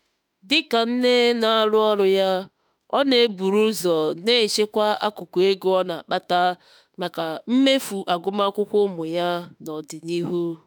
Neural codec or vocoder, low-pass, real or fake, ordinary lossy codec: autoencoder, 48 kHz, 32 numbers a frame, DAC-VAE, trained on Japanese speech; none; fake; none